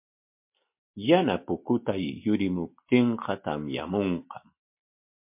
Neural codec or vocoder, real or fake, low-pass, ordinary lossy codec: none; real; 3.6 kHz; MP3, 32 kbps